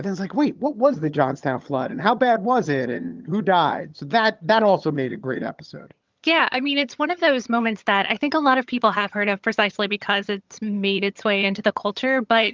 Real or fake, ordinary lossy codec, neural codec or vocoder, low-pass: fake; Opus, 32 kbps; vocoder, 22.05 kHz, 80 mel bands, HiFi-GAN; 7.2 kHz